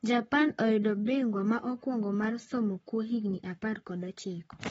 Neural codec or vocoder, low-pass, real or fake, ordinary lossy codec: codec, 44.1 kHz, 7.8 kbps, Pupu-Codec; 19.8 kHz; fake; AAC, 24 kbps